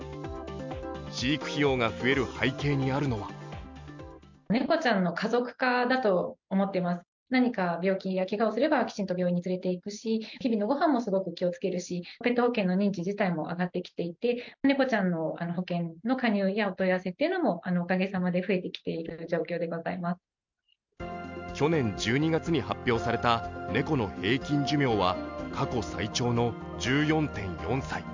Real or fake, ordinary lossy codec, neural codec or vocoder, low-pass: real; MP3, 64 kbps; none; 7.2 kHz